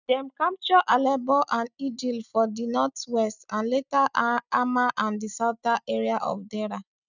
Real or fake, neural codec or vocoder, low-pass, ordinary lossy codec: real; none; 7.2 kHz; none